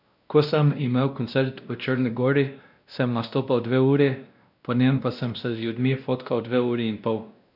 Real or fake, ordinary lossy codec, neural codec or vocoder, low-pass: fake; none; codec, 16 kHz, 1 kbps, X-Codec, WavLM features, trained on Multilingual LibriSpeech; 5.4 kHz